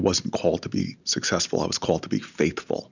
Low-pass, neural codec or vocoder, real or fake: 7.2 kHz; none; real